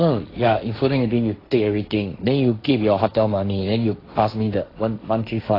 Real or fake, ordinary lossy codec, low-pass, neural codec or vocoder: fake; AAC, 24 kbps; 5.4 kHz; codec, 16 kHz, 1.1 kbps, Voila-Tokenizer